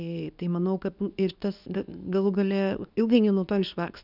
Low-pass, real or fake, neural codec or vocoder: 5.4 kHz; fake; codec, 24 kHz, 0.9 kbps, WavTokenizer, medium speech release version 2